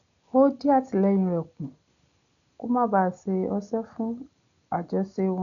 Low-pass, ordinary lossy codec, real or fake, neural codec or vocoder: 7.2 kHz; none; real; none